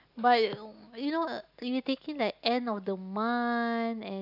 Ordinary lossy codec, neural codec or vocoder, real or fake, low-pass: none; none; real; 5.4 kHz